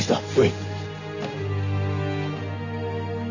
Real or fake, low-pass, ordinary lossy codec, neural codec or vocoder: real; 7.2 kHz; none; none